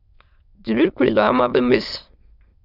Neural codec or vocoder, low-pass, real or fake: autoencoder, 22.05 kHz, a latent of 192 numbers a frame, VITS, trained on many speakers; 5.4 kHz; fake